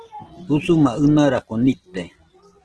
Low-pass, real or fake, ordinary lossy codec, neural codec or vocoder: 10.8 kHz; real; Opus, 16 kbps; none